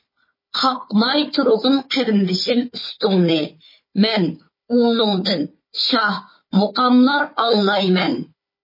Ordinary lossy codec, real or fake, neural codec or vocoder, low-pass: MP3, 24 kbps; fake; codec, 16 kHz, 4 kbps, FunCodec, trained on Chinese and English, 50 frames a second; 5.4 kHz